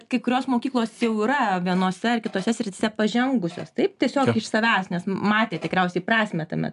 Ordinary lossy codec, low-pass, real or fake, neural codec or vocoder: MP3, 96 kbps; 10.8 kHz; real; none